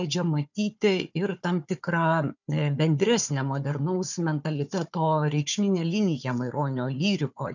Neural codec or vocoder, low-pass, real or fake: codec, 16 kHz, 4 kbps, FunCodec, trained on Chinese and English, 50 frames a second; 7.2 kHz; fake